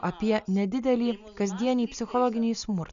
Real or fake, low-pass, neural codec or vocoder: real; 7.2 kHz; none